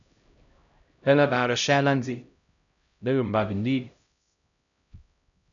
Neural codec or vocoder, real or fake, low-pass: codec, 16 kHz, 0.5 kbps, X-Codec, HuBERT features, trained on LibriSpeech; fake; 7.2 kHz